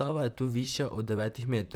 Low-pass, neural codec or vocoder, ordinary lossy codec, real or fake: none; vocoder, 44.1 kHz, 128 mel bands, Pupu-Vocoder; none; fake